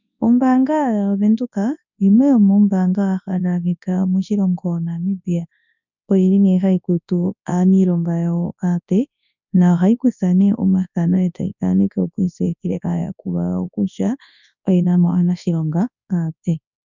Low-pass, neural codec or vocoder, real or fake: 7.2 kHz; codec, 24 kHz, 0.9 kbps, WavTokenizer, large speech release; fake